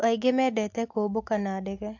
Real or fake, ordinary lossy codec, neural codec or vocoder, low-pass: real; AAC, 48 kbps; none; 7.2 kHz